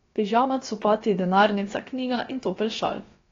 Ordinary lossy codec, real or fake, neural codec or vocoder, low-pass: AAC, 32 kbps; fake; codec, 16 kHz, about 1 kbps, DyCAST, with the encoder's durations; 7.2 kHz